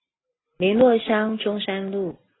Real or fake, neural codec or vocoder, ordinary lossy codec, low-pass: real; none; AAC, 16 kbps; 7.2 kHz